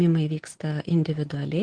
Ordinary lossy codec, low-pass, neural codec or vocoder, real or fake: Opus, 16 kbps; 9.9 kHz; none; real